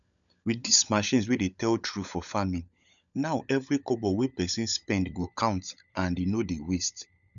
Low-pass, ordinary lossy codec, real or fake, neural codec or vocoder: 7.2 kHz; none; fake; codec, 16 kHz, 16 kbps, FunCodec, trained on LibriTTS, 50 frames a second